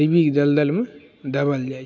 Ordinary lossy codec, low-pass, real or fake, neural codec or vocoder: none; none; real; none